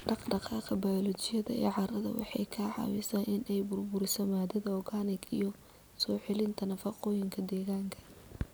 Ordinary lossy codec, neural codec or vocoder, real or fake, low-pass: none; none; real; none